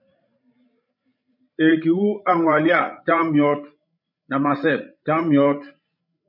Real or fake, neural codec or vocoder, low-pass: fake; codec, 16 kHz, 16 kbps, FreqCodec, larger model; 5.4 kHz